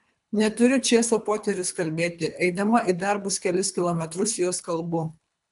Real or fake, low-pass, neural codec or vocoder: fake; 10.8 kHz; codec, 24 kHz, 3 kbps, HILCodec